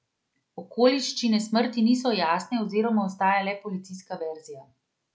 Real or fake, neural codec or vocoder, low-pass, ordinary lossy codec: real; none; none; none